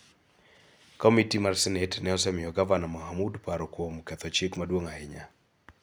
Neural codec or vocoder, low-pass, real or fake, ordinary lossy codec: none; none; real; none